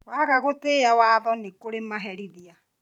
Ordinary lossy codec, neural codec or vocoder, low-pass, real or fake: none; vocoder, 44.1 kHz, 128 mel bands every 512 samples, BigVGAN v2; 19.8 kHz; fake